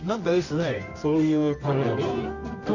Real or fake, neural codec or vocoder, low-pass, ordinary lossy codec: fake; codec, 24 kHz, 0.9 kbps, WavTokenizer, medium music audio release; 7.2 kHz; Opus, 64 kbps